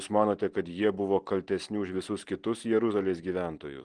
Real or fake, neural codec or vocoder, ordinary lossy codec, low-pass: real; none; Opus, 16 kbps; 9.9 kHz